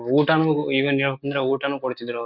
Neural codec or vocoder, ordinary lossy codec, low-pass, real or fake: none; none; 5.4 kHz; real